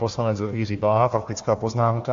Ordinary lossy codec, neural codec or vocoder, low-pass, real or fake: AAC, 48 kbps; codec, 16 kHz, 1 kbps, FunCodec, trained on Chinese and English, 50 frames a second; 7.2 kHz; fake